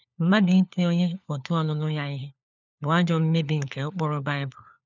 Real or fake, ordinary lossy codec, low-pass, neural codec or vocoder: fake; none; 7.2 kHz; codec, 16 kHz, 4 kbps, FunCodec, trained on LibriTTS, 50 frames a second